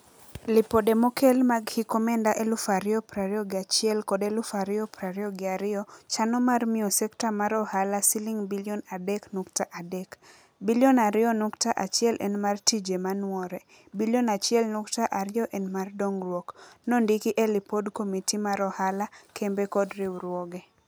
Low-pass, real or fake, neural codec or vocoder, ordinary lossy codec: none; real; none; none